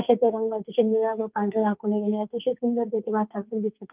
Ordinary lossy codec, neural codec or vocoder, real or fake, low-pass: Opus, 24 kbps; codec, 44.1 kHz, 2.6 kbps, SNAC; fake; 3.6 kHz